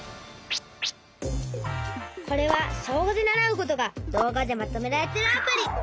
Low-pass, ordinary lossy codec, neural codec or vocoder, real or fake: none; none; none; real